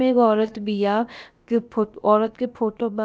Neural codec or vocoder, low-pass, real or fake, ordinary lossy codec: codec, 16 kHz, about 1 kbps, DyCAST, with the encoder's durations; none; fake; none